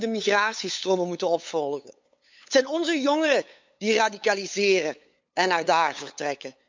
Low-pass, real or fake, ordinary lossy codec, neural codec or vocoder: 7.2 kHz; fake; MP3, 64 kbps; codec, 16 kHz, 8 kbps, FunCodec, trained on LibriTTS, 25 frames a second